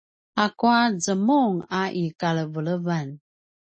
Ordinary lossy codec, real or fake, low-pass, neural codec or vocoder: MP3, 32 kbps; real; 9.9 kHz; none